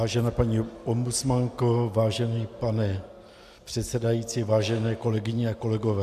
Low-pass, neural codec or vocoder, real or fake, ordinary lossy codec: 14.4 kHz; none; real; MP3, 96 kbps